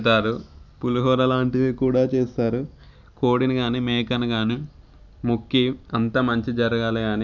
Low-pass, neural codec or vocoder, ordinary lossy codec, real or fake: 7.2 kHz; none; none; real